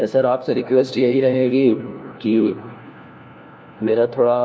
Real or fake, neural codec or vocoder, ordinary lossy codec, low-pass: fake; codec, 16 kHz, 1 kbps, FunCodec, trained on LibriTTS, 50 frames a second; none; none